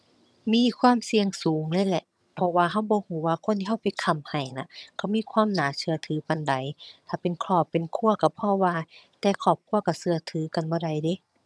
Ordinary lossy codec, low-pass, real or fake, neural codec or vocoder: none; none; fake; vocoder, 22.05 kHz, 80 mel bands, HiFi-GAN